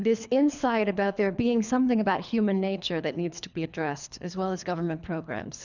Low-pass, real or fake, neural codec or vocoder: 7.2 kHz; fake; codec, 24 kHz, 3 kbps, HILCodec